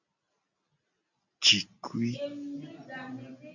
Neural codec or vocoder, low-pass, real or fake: none; 7.2 kHz; real